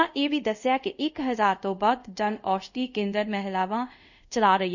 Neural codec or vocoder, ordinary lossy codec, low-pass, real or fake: codec, 24 kHz, 0.5 kbps, DualCodec; none; 7.2 kHz; fake